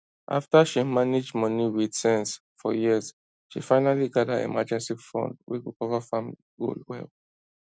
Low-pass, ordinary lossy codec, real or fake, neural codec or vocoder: none; none; real; none